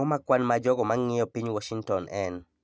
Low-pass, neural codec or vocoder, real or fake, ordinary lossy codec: none; none; real; none